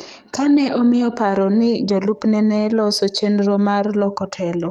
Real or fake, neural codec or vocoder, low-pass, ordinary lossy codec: fake; codec, 44.1 kHz, 7.8 kbps, DAC; 19.8 kHz; none